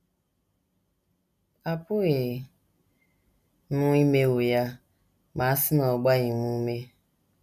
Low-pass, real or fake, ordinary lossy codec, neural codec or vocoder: 14.4 kHz; real; none; none